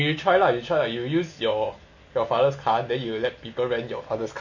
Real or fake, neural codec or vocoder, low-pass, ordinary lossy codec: real; none; 7.2 kHz; none